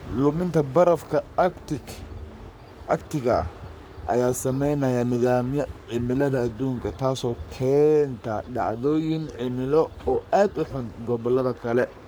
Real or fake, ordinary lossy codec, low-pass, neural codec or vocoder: fake; none; none; codec, 44.1 kHz, 3.4 kbps, Pupu-Codec